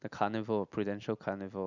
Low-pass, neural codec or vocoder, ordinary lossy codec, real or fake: 7.2 kHz; none; none; real